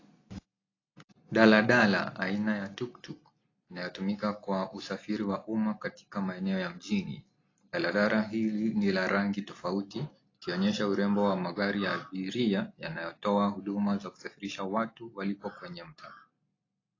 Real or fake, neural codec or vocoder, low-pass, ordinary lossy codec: real; none; 7.2 kHz; AAC, 32 kbps